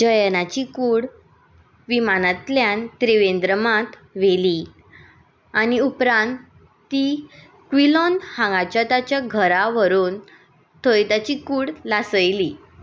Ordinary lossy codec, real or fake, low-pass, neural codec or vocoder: none; real; none; none